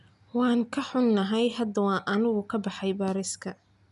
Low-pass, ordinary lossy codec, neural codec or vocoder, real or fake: 10.8 kHz; none; none; real